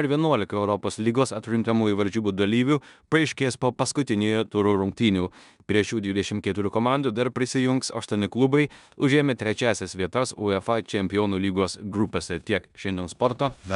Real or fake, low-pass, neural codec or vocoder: fake; 10.8 kHz; codec, 16 kHz in and 24 kHz out, 0.9 kbps, LongCat-Audio-Codec, four codebook decoder